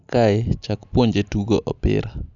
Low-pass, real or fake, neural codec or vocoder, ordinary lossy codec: 7.2 kHz; real; none; none